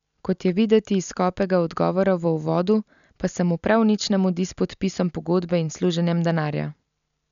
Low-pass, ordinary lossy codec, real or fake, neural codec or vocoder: 7.2 kHz; none; real; none